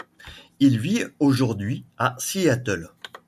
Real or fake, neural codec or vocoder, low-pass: real; none; 14.4 kHz